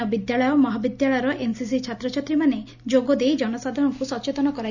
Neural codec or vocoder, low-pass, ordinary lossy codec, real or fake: none; 7.2 kHz; none; real